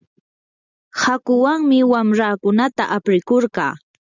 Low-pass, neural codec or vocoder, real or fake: 7.2 kHz; none; real